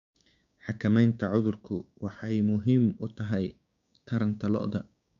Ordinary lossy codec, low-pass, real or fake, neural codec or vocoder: none; 7.2 kHz; fake; codec, 16 kHz, 6 kbps, DAC